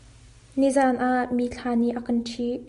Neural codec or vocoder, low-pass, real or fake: none; 10.8 kHz; real